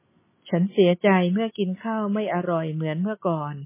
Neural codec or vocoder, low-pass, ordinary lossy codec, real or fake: none; 3.6 kHz; MP3, 16 kbps; real